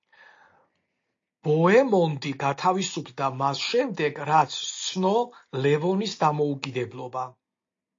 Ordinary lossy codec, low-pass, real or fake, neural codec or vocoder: AAC, 48 kbps; 7.2 kHz; real; none